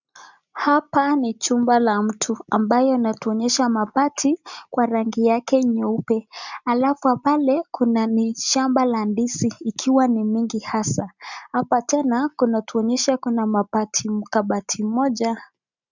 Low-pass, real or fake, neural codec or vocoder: 7.2 kHz; real; none